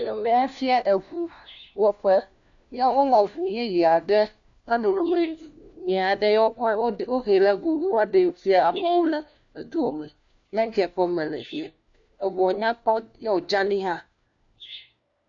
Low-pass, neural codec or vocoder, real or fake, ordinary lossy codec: 7.2 kHz; codec, 16 kHz, 1 kbps, FunCodec, trained on LibriTTS, 50 frames a second; fake; AAC, 64 kbps